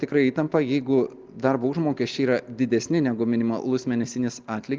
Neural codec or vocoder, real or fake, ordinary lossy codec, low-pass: none; real; Opus, 24 kbps; 7.2 kHz